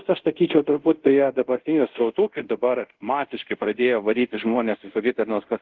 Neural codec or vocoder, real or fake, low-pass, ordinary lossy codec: codec, 24 kHz, 0.5 kbps, DualCodec; fake; 7.2 kHz; Opus, 16 kbps